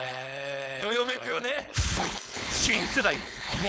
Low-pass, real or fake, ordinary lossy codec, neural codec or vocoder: none; fake; none; codec, 16 kHz, 4.8 kbps, FACodec